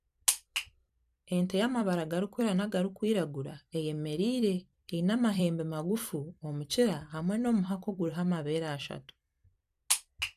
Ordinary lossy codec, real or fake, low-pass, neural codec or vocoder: none; fake; 14.4 kHz; vocoder, 44.1 kHz, 128 mel bands every 256 samples, BigVGAN v2